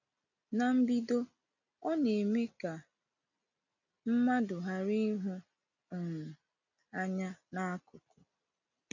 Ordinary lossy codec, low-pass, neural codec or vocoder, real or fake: none; 7.2 kHz; none; real